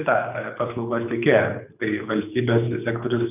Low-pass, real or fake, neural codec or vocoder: 3.6 kHz; fake; codec, 24 kHz, 6 kbps, HILCodec